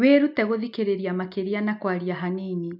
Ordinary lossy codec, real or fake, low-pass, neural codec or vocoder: MP3, 48 kbps; real; 5.4 kHz; none